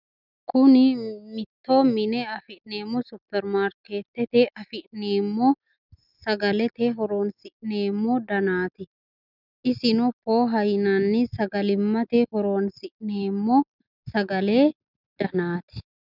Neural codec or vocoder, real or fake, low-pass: none; real; 5.4 kHz